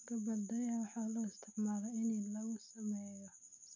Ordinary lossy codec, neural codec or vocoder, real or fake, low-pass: none; none; real; 7.2 kHz